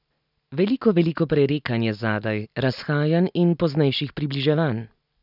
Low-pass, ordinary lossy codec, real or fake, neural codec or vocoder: 5.4 kHz; none; real; none